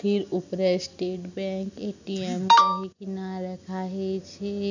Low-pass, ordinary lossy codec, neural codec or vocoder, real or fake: 7.2 kHz; none; none; real